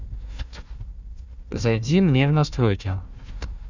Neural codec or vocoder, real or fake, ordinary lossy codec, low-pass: codec, 16 kHz, 1 kbps, FunCodec, trained on Chinese and English, 50 frames a second; fake; none; 7.2 kHz